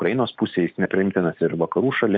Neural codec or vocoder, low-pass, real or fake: none; 7.2 kHz; real